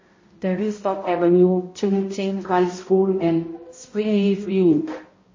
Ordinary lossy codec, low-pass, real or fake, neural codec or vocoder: MP3, 32 kbps; 7.2 kHz; fake; codec, 16 kHz, 0.5 kbps, X-Codec, HuBERT features, trained on balanced general audio